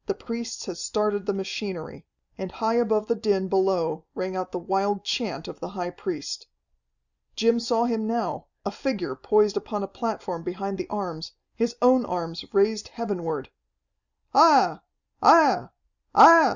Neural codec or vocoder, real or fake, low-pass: none; real; 7.2 kHz